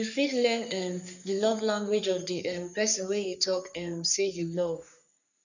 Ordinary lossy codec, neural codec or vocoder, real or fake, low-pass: none; codec, 44.1 kHz, 3.4 kbps, Pupu-Codec; fake; 7.2 kHz